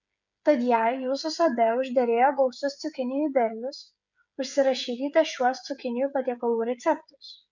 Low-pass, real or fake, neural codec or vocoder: 7.2 kHz; fake; codec, 16 kHz, 8 kbps, FreqCodec, smaller model